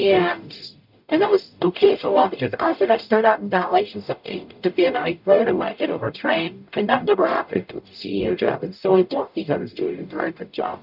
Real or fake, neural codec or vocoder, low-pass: fake; codec, 44.1 kHz, 0.9 kbps, DAC; 5.4 kHz